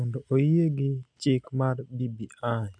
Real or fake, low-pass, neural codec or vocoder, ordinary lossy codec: real; 9.9 kHz; none; none